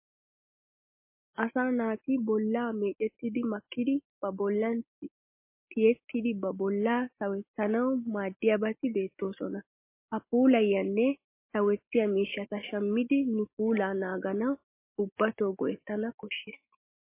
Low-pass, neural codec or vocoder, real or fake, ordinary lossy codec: 3.6 kHz; none; real; MP3, 24 kbps